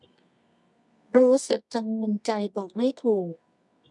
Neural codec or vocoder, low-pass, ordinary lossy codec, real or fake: codec, 24 kHz, 0.9 kbps, WavTokenizer, medium music audio release; 10.8 kHz; none; fake